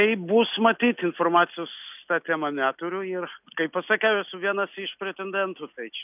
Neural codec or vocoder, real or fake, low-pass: none; real; 3.6 kHz